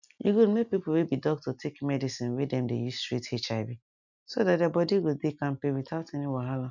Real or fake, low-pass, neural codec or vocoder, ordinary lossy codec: real; 7.2 kHz; none; none